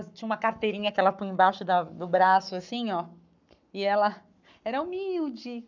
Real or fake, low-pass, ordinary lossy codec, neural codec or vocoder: fake; 7.2 kHz; none; codec, 44.1 kHz, 7.8 kbps, Pupu-Codec